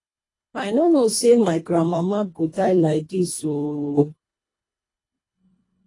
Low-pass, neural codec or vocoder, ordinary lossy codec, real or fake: 10.8 kHz; codec, 24 kHz, 1.5 kbps, HILCodec; AAC, 32 kbps; fake